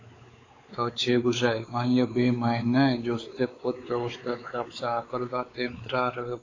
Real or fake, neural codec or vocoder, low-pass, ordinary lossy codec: fake; codec, 16 kHz, 4 kbps, X-Codec, WavLM features, trained on Multilingual LibriSpeech; 7.2 kHz; AAC, 32 kbps